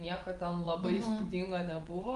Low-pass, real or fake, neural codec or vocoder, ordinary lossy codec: 10.8 kHz; fake; vocoder, 24 kHz, 100 mel bands, Vocos; Opus, 24 kbps